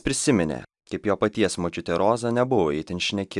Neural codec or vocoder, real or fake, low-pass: none; real; 10.8 kHz